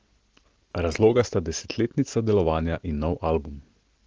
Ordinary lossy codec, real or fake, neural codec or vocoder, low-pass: Opus, 16 kbps; real; none; 7.2 kHz